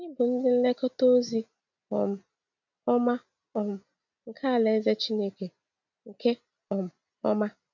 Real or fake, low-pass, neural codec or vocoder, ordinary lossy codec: real; 7.2 kHz; none; none